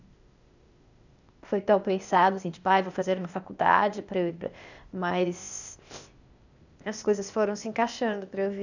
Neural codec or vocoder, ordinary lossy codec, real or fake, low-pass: codec, 16 kHz, 0.8 kbps, ZipCodec; none; fake; 7.2 kHz